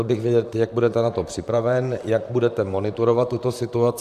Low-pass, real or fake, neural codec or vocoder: 14.4 kHz; fake; vocoder, 44.1 kHz, 128 mel bands, Pupu-Vocoder